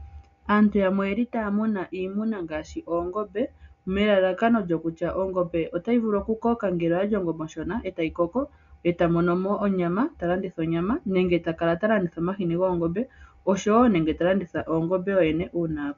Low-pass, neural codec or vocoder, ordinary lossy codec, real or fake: 7.2 kHz; none; MP3, 96 kbps; real